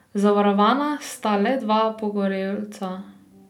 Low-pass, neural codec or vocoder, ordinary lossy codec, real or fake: 19.8 kHz; none; none; real